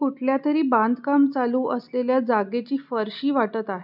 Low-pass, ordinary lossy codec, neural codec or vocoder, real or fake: 5.4 kHz; none; none; real